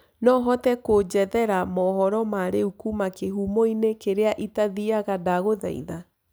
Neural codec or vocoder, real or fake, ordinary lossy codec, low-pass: none; real; none; none